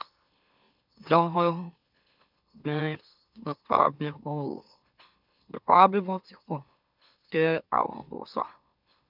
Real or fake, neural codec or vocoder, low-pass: fake; autoencoder, 44.1 kHz, a latent of 192 numbers a frame, MeloTTS; 5.4 kHz